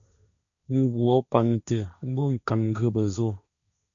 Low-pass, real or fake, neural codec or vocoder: 7.2 kHz; fake; codec, 16 kHz, 1.1 kbps, Voila-Tokenizer